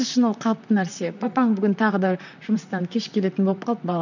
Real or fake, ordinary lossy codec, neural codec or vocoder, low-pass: fake; none; vocoder, 44.1 kHz, 128 mel bands, Pupu-Vocoder; 7.2 kHz